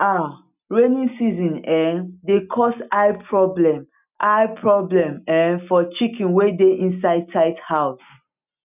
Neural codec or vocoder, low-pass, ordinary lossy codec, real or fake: none; 3.6 kHz; none; real